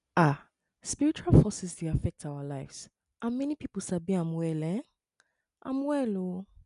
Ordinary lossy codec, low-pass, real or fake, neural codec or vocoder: none; 10.8 kHz; real; none